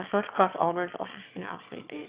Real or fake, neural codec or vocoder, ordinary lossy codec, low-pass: fake; autoencoder, 22.05 kHz, a latent of 192 numbers a frame, VITS, trained on one speaker; Opus, 64 kbps; 3.6 kHz